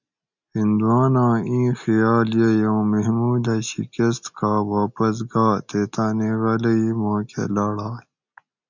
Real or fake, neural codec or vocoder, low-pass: real; none; 7.2 kHz